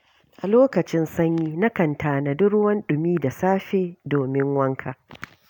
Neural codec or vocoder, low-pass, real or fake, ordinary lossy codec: none; 19.8 kHz; real; none